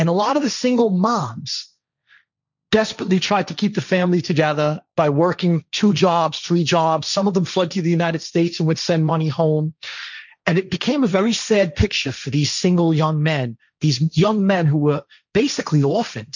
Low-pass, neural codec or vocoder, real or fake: 7.2 kHz; codec, 16 kHz, 1.1 kbps, Voila-Tokenizer; fake